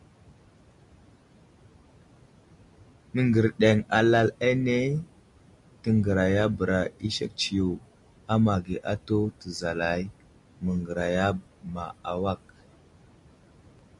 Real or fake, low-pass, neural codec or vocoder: real; 10.8 kHz; none